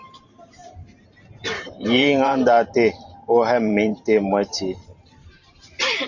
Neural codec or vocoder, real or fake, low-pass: vocoder, 44.1 kHz, 128 mel bands every 512 samples, BigVGAN v2; fake; 7.2 kHz